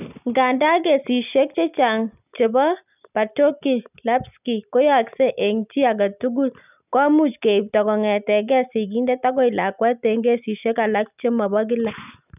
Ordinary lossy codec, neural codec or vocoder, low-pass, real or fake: none; none; 3.6 kHz; real